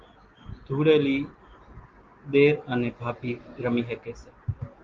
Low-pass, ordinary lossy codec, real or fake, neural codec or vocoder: 7.2 kHz; Opus, 16 kbps; real; none